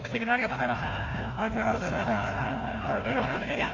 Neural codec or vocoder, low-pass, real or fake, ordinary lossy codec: codec, 16 kHz, 1 kbps, FunCodec, trained on Chinese and English, 50 frames a second; 7.2 kHz; fake; AAC, 32 kbps